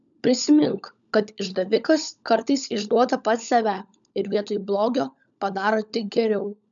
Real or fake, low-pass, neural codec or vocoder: fake; 7.2 kHz; codec, 16 kHz, 16 kbps, FunCodec, trained on LibriTTS, 50 frames a second